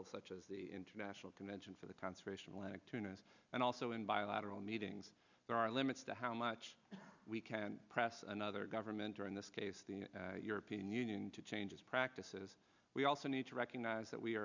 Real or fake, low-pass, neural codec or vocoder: real; 7.2 kHz; none